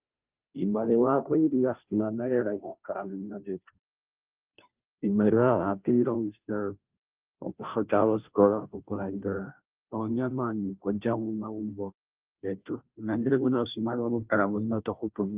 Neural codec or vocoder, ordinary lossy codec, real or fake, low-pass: codec, 16 kHz, 0.5 kbps, FunCodec, trained on Chinese and English, 25 frames a second; Opus, 24 kbps; fake; 3.6 kHz